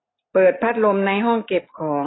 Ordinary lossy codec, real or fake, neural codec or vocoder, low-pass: AAC, 16 kbps; real; none; 7.2 kHz